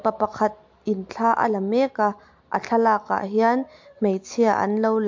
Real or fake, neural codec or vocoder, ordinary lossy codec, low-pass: real; none; MP3, 48 kbps; 7.2 kHz